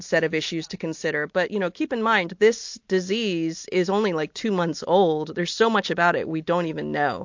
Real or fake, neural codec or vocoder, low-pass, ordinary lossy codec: real; none; 7.2 kHz; MP3, 48 kbps